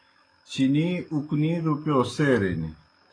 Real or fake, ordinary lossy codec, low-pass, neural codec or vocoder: fake; AAC, 32 kbps; 9.9 kHz; autoencoder, 48 kHz, 128 numbers a frame, DAC-VAE, trained on Japanese speech